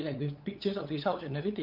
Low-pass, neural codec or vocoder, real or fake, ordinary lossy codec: 5.4 kHz; codec, 16 kHz, 8 kbps, FunCodec, trained on LibriTTS, 25 frames a second; fake; Opus, 24 kbps